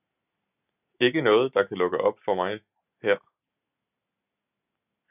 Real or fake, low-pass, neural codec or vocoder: real; 3.6 kHz; none